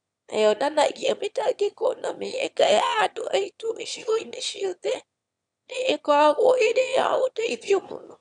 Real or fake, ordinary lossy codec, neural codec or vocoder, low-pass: fake; none; autoencoder, 22.05 kHz, a latent of 192 numbers a frame, VITS, trained on one speaker; 9.9 kHz